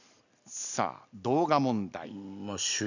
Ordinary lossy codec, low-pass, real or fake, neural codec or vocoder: none; 7.2 kHz; real; none